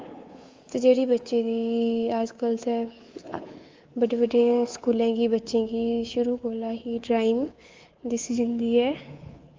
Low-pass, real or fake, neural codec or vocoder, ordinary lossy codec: 7.2 kHz; fake; codec, 24 kHz, 3.1 kbps, DualCodec; Opus, 32 kbps